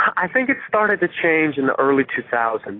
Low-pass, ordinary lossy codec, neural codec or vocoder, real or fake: 5.4 kHz; AAC, 24 kbps; none; real